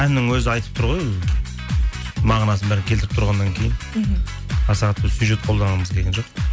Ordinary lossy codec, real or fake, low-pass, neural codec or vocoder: none; real; none; none